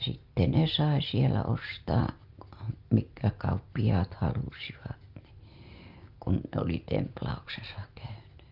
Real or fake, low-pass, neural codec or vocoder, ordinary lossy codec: real; 5.4 kHz; none; Opus, 32 kbps